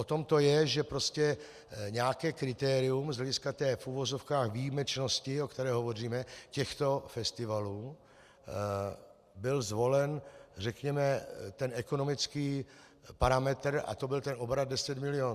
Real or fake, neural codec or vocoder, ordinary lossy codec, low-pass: real; none; Opus, 64 kbps; 14.4 kHz